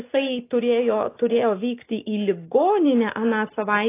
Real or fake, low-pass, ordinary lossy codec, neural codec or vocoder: real; 3.6 kHz; AAC, 24 kbps; none